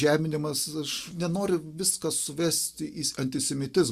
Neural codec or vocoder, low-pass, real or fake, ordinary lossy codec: none; 14.4 kHz; real; Opus, 64 kbps